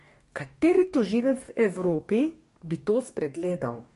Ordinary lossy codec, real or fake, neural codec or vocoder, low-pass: MP3, 48 kbps; fake; codec, 44.1 kHz, 2.6 kbps, DAC; 14.4 kHz